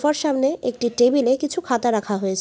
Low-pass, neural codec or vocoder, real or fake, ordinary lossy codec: none; none; real; none